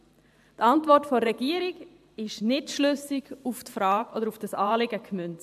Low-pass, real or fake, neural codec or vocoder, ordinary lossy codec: 14.4 kHz; fake; vocoder, 44.1 kHz, 128 mel bands every 512 samples, BigVGAN v2; AAC, 96 kbps